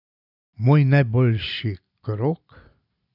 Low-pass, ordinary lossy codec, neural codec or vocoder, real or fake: 5.4 kHz; AAC, 48 kbps; none; real